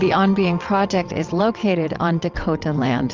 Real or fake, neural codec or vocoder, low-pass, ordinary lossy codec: fake; vocoder, 22.05 kHz, 80 mel bands, WaveNeXt; 7.2 kHz; Opus, 32 kbps